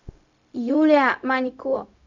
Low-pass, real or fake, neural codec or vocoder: 7.2 kHz; fake; codec, 16 kHz, 0.4 kbps, LongCat-Audio-Codec